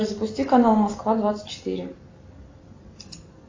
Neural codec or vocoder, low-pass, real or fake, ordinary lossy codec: none; 7.2 kHz; real; AAC, 32 kbps